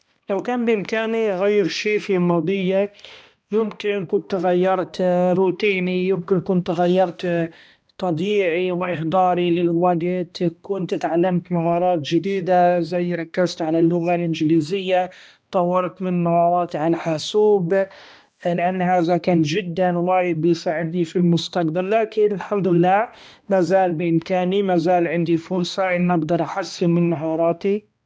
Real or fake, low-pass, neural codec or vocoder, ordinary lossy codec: fake; none; codec, 16 kHz, 1 kbps, X-Codec, HuBERT features, trained on balanced general audio; none